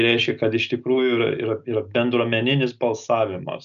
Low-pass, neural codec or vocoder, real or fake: 7.2 kHz; none; real